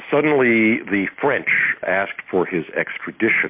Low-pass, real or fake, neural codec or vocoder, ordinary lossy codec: 3.6 kHz; real; none; MP3, 32 kbps